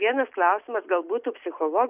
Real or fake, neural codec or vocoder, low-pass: real; none; 3.6 kHz